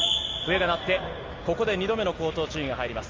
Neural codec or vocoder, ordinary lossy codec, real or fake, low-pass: none; Opus, 32 kbps; real; 7.2 kHz